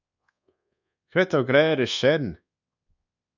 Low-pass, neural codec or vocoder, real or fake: 7.2 kHz; codec, 24 kHz, 1.2 kbps, DualCodec; fake